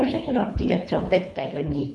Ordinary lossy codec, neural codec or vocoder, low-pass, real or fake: none; codec, 24 kHz, 3 kbps, HILCodec; none; fake